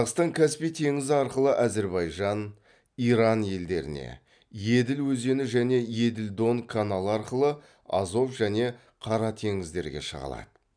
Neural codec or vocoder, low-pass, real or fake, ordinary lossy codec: none; 9.9 kHz; real; none